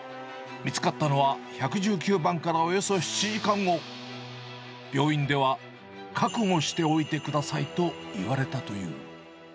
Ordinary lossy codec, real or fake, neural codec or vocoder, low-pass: none; real; none; none